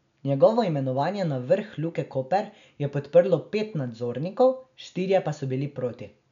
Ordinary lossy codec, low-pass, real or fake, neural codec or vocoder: MP3, 96 kbps; 7.2 kHz; real; none